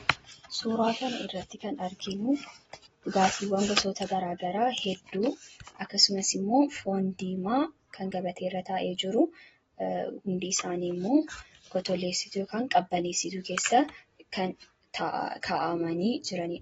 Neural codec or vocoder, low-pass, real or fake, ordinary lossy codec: none; 19.8 kHz; real; AAC, 24 kbps